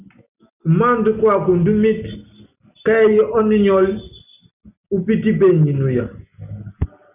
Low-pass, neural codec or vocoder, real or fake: 3.6 kHz; none; real